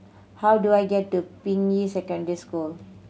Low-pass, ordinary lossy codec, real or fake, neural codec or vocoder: none; none; real; none